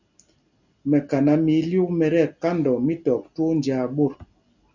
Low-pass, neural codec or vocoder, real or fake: 7.2 kHz; none; real